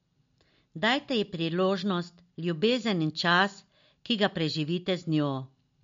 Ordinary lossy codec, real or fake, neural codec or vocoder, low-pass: MP3, 48 kbps; real; none; 7.2 kHz